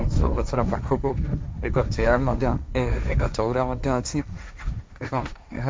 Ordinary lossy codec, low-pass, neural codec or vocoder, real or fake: none; none; codec, 16 kHz, 1.1 kbps, Voila-Tokenizer; fake